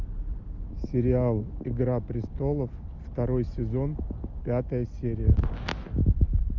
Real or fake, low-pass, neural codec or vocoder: real; 7.2 kHz; none